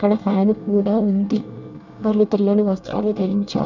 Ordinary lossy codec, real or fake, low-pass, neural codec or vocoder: none; fake; 7.2 kHz; codec, 24 kHz, 1 kbps, SNAC